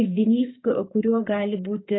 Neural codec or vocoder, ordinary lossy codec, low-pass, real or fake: codec, 44.1 kHz, 2.6 kbps, SNAC; AAC, 16 kbps; 7.2 kHz; fake